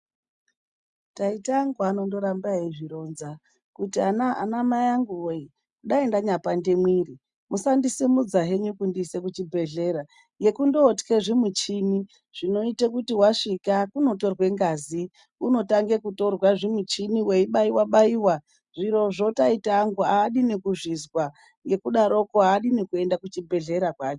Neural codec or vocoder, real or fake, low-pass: none; real; 10.8 kHz